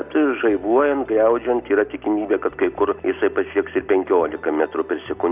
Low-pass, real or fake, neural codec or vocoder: 3.6 kHz; real; none